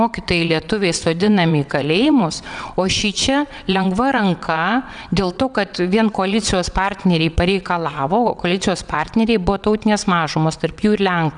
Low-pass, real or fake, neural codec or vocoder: 9.9 kHz; fake; vocoder, 22.05 kHz, 80 mel bands, WaveNeXt